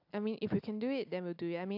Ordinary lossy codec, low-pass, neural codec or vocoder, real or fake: AAC, 48 kbps; 5.4 kHz; none; real